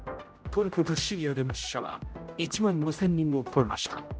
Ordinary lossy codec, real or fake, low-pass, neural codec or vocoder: none; fake; none; codec, 16 kHz, 0.5 kbps, X-Codec, HuBERT features, trained on general audio